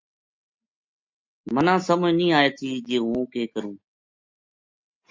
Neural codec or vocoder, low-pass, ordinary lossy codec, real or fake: none; 7.2 kHz; MP3, 48 kbps; real